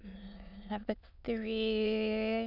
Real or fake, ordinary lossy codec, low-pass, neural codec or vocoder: fake; none; 5.4 kHz; autoencoder, 22.05 kHz, a latent of 192 numbers a frame, VITS, trained on many speakers